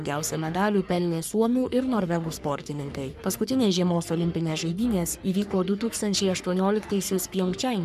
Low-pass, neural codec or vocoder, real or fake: 14.4 kHz; codec, 44.1 kHz, 3.4 kbps, Pupu-Codec; fake